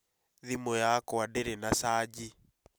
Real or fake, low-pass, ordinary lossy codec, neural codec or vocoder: real; none; none; none